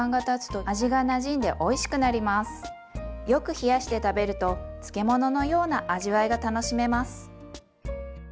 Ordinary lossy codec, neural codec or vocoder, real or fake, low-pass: none; none; real; none